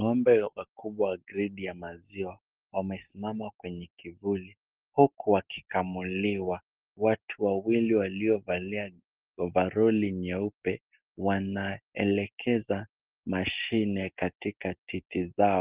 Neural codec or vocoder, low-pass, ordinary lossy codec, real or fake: none; 3.6 kHz; Opus, 16 kbps; real